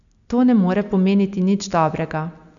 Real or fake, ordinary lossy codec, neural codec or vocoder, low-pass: real; none; none; 7.2 kHz